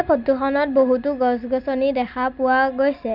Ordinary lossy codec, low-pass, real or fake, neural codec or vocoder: none; 5.4 kHz; real; none